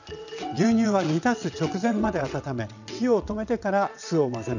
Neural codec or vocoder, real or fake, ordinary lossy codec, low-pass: vocoder, 22.05 kHz, 80 mel bands, WaveNeXt; fake; none; 7.2 kHz